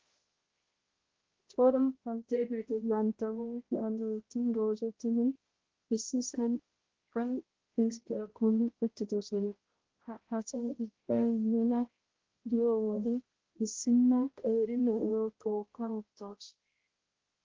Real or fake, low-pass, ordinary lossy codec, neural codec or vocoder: fake; 7.2 kHz; Opus, 16 kbps; codec, 16 kHz, 0.5 kbps, X-Codec, HuBERT features, trained on balanced general audio